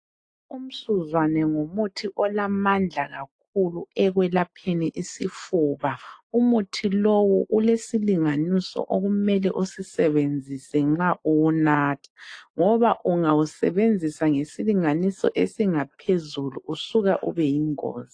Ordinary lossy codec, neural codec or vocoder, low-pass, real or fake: AAC, 48 kbps; none; 9.9 kHz; real